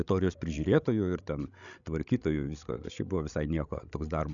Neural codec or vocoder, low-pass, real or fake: codec, 16 kHz, 16 kbps, FreqCodec, larger model; 7.2 kHz; fake